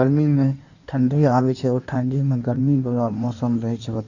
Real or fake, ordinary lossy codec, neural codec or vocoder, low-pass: fake; none; codec, 16 kHz in and 24 kHz out, 1.1 kbps, FireRedTTS-2 codec; 7.2 kHz